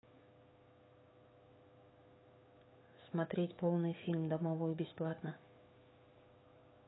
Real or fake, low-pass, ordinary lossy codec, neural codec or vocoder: fake; 7.2 kHz; AAC, 16 kbps; autoencoder, 48 kHz, 128 numbers a frame, DAC-VAE, trained on Japanese speech